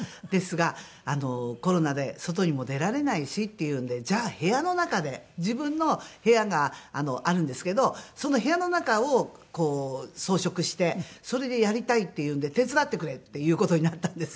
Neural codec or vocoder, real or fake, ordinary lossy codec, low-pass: none; real; none; none